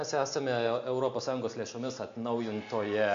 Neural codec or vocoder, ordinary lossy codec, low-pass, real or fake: none; AAC, 64 kbps; 7.2 kHz; real